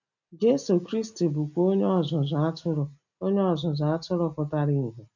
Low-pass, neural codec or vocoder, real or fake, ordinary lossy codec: 7.2 kHz; none; real; none